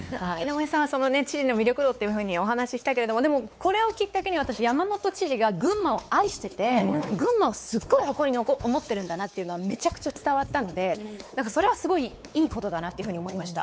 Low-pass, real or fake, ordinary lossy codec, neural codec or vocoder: none; fake; none; codec, 16 kHz, 4 kbps, X-Codec, WavLM features, trained on Multilingual LibriSpeech